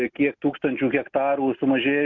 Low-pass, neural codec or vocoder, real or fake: 7.2 kHz; none; real